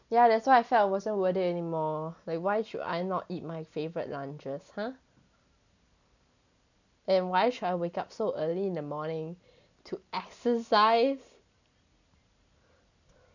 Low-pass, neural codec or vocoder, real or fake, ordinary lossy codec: 7.2 kHz; none; real; none